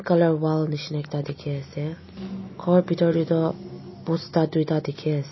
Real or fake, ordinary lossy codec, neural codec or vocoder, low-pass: real; MP3, 24 kbps; none; 7.2 kHz